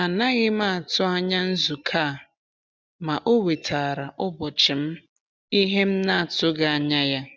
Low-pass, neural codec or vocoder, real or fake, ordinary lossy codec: none; none; real; none